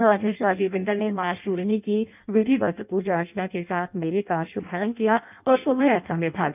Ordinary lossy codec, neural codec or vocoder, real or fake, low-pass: none; codec, 16 kHz in and 24 kHz out, 0.6 kbps, FireRedTTS-2 codec; fake; 3.6 kHz